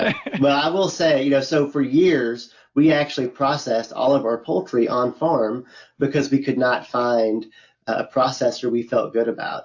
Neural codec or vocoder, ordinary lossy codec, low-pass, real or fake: none; AAC, 48 kbps; 7.2 kHz; real